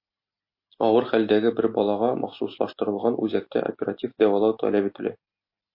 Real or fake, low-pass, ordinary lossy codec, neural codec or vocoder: real; 5.4 kHz; MP3, 32 kbps; none